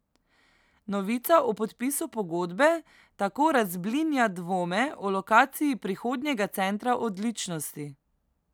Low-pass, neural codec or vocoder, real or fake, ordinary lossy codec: none; none; real; none